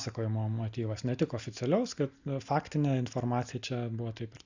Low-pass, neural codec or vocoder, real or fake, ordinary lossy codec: 7.2 kHz; none; real; Opus, 64 kbps